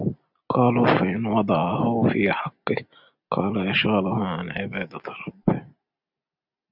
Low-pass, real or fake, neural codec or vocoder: 5.4 kHz; real; none